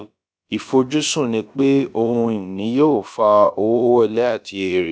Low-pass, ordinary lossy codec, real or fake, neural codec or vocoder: none; none; fake; codec, 16 kHz, about 1 kbps, DyCAST, with the encoder's durations